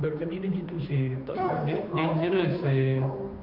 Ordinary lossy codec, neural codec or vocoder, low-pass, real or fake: none; codec, 16 kHz, 2 kbps, FunCodec, trained on Chinese and English, 25 frames a second; 5.4 kHz; fake